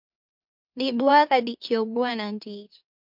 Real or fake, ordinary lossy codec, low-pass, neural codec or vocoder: fake; MP3, 48 kbps; 5.4 kHz; autoencoder, 44.1 kHz, a latent of 192 numbers a frame, MeloTTS